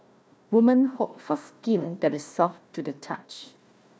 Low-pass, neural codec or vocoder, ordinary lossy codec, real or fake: none; codec, 16 kHz, 1 kbps, FunCodec, trained on Chinese and English, 50 frames a second; none; fake